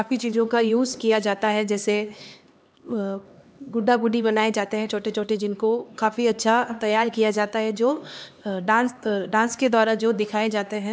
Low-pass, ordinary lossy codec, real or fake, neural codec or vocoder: none; none; fake; codec, 16 kHz, 2 kbps, X-Codec, HuBERT features, trained on LibriSpeech